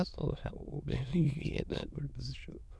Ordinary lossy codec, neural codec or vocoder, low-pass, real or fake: none; autoencoder, 22.05 kHz, a latent of 192 numbers a frame, VITS, trained on many speakers; none; fake